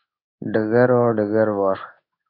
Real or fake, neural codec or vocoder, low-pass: fake; codec, 16 kHz in and 24 kHz out, 1 kbps, XY-Tokenizer; 5.4 kHz